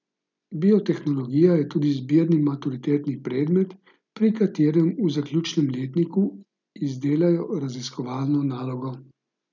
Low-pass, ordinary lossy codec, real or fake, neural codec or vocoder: 7.2 kHz; none; real; none